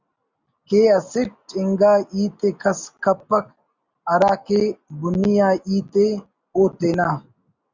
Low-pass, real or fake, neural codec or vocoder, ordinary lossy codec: 7.2 kHz; real; none; Opus, 64 kbps